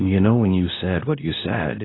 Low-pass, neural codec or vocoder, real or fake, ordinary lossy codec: 7.2 kHz; codec, 24 kHz, 0.9 kbps, WavTokenizer, medium speech release version 2; fake; AAC, 16 kbps